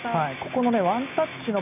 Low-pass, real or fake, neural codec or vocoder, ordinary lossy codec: 3.6 kHz; real; none; none